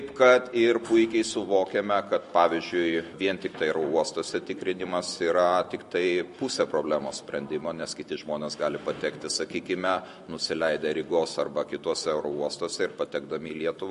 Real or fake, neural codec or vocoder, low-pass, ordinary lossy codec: real; none; 14.4 kHz; MP3, 48 kbps